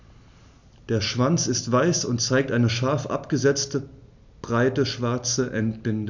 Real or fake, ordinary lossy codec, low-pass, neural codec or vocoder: real; none; 7.2 kHz; none